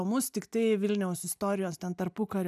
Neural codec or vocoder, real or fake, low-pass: none; real; 14.4 kHz